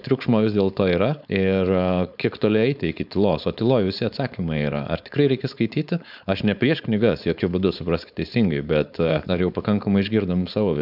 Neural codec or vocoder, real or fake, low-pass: codec, 16 kHz, 4.8 kbps, FACodec; fake; 5.4 kHz